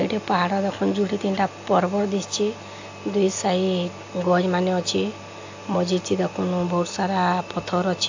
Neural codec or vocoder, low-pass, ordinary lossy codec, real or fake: none; 7.2 kHz; AAC, 48 kbps; real